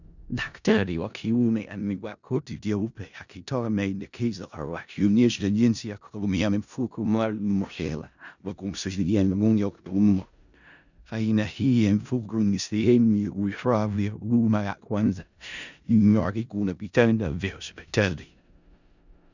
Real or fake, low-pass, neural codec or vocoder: fake; 7.2 kHz; codec, 16 kHz in and 24 kHz out, 0.4 kbps, LongCat-Audio-Codec, four codebook decoder